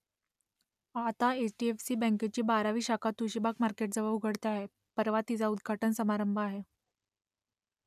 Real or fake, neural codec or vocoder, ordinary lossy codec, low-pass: real; none; none; 14.4 kHz